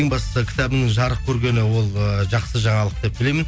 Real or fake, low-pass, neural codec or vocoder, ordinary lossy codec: real; none; none; none